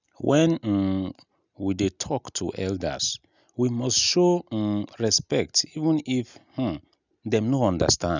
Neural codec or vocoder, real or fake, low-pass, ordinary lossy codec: none; real; 7.2 kHz; none